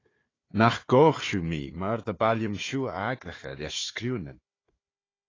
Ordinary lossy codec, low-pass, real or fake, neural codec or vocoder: AAC, 32 kbps; 7.2 kHz; fake; codec, 16 kHz, 4 kbps, FunCodec, trained on Chinese and English, 50 frames a second